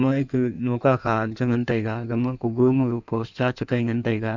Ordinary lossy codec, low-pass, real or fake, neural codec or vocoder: none; 7.2 kHz; fake; codec, 16 kHz in and 24 kHz out, 1.1 kbps, FireRedTTS-2 codec